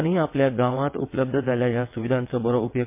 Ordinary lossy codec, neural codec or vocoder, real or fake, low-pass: MP3, 24 kbps; vocoder, 22.05 kHz, 80 mel bands, WaveNeXt; fake; 3.6 kHz